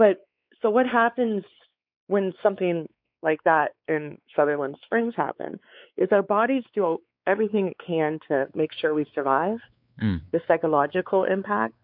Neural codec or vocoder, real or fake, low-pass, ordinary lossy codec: codec, 16 kHz, 4 kbps, X-Codec, WavLM features, trained on Multilingual LibriSpeech; fake; 5.4 kHz; MP3, 48 kbps